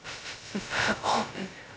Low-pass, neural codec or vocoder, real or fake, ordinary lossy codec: none; codec, 16 kHz, 0.2 kbps, FocalCodec; fake; none